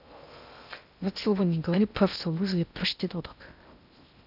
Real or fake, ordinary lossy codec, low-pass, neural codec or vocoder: fake; none; 5.4 kHz; codec, 16 kHz in and 24 kHz out, 0.6 kbps, FocalCodec, streaming, 2048 codes